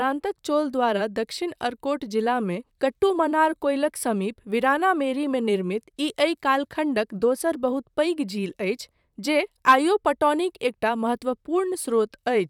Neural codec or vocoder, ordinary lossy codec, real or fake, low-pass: vocoder, 44.1 kHz, 128 mel bands, Pupu-Vocoder; none; fake; 19.8 kHz